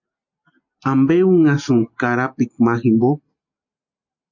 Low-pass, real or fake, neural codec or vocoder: 7.2 kHz; real; none